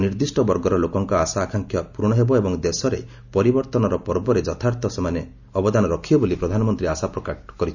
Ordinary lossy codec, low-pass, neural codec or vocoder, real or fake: none; 7.2 kHz; none; real